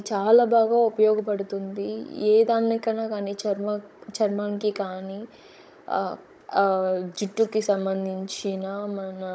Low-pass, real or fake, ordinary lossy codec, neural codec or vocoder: none; fake; none; codec, 16 kHz, 16 kbps, FunCodec, trained on Chinese and English, 50 frames a second